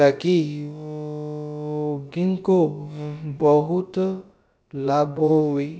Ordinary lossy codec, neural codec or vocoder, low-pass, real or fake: none; codec, 16 kHz, about 1 kbps, DyCAST, with the encoder's durations; none; fake